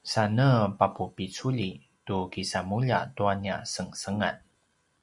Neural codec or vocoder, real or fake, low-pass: none; real; 10.8 kHz